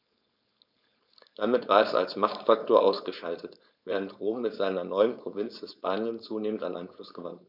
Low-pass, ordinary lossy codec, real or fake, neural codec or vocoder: 5.4 kHz; none; fake; codec, 16 kHz, 4.8 kbps, FACodec